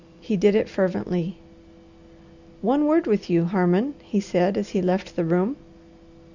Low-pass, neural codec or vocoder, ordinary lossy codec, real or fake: 7.2 kHz; none; Opus, 64 kbps; real